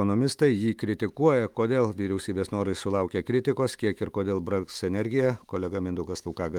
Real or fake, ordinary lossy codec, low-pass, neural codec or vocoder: fake; Opus, 32 kbps; 19.8 kHz; autoencoder, 48 kHz, 128 numbers a frame, DAC-VAE, trained on Japanese speech